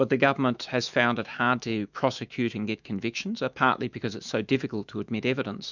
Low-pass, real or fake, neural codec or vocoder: 7.2 kHz; fake; autoencoder, 48 kHz, 128 numbers a frame, DAC-VAE, trained on Japanese speech